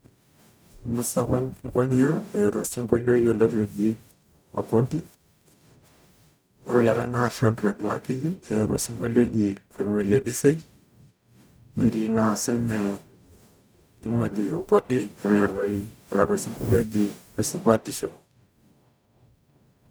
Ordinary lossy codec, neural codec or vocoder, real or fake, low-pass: none; codec, 44.1 kHz, 0.9 kbps, DAC; fake; none